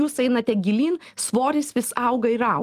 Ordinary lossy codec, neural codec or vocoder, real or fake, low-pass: Opus, 32 kbps; none; real; 14.4 kHz